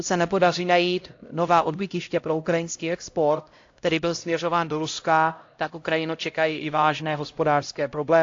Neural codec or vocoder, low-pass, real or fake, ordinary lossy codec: codec, 16 kHz, 0.5 kbps, X-Codec, HuBERT features, trained on LibriSpeech; 7.2 kHz; fake; AAC, 48 kbps